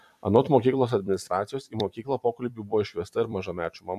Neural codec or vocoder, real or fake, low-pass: vocoder, 44.1 kHz, 128 mel bands every 256 samples, BigVGAN v2; fake; 14.4 kHz